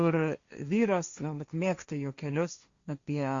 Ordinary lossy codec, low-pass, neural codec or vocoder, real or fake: Opus, 64 kbps; 7.2 kHz; codec, 16 kHz, 1.1 kbps, Voila-Tokenizer; fake